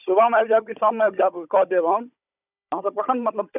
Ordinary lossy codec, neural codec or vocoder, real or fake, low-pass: none; codec, 16 kHz, 16 kbps, FunCodec, trained on Chinese and English, 50 frames a second; fake; 3.6 kHz